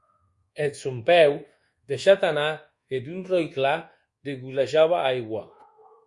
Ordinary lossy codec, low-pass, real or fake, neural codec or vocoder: Opus, 64 kbps; 10.8 kHz; fake; codec, 24 kHz, 1.2 kbps, DualCodec